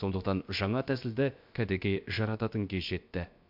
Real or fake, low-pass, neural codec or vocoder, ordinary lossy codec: fake; 5.4 kHz; codec, 16 kHz, about 1 kbps, DyCAST, with the encoder's durations; MP3, 48 kbps